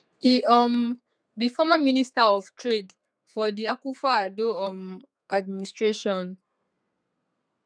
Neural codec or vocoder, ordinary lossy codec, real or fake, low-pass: codec, 32 kHz, 1.9 kbps, SNAC; none; fake; 9.9 kHz